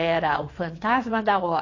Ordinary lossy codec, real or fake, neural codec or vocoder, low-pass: AAC, 32 kbps; fake; vocoder, 22.05 kHz, 80 mel bands, WaveNeXt; 7.2 kHz